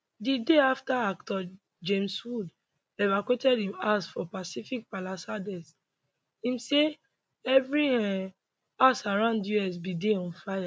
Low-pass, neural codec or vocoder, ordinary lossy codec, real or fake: none; none; none; real